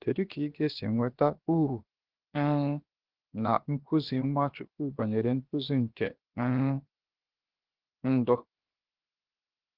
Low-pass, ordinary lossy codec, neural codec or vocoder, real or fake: 5.4 kHz; Opus, 16 kbps; codec, 16 kHz, 0.7 kbps, FocalCodec; fake